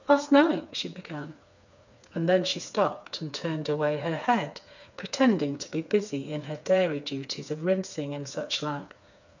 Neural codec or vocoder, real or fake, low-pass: codec, 16 kHz, 4 kbps, FreqCodec, smaller model; fake; 7.2 kHz